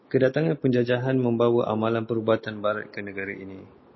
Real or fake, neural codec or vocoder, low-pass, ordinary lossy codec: real; none; 7.2 kHz; MP3, 24 kbps